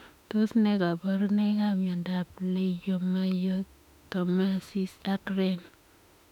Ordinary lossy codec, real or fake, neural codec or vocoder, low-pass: none; fake; autoencoder, 48 kHz, 32 numbers a frame, DAC-VAE, trained on Japanese speech; 19.8 kHz